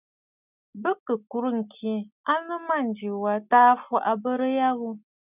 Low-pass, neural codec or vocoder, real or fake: 3.6 kHz; none; real